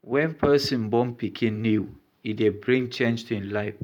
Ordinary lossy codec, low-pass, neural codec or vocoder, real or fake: none; 19.8 kHz; vocoder, 44.1 kHz, 128 mel bands every 512 samples, BigVGAN v2; fake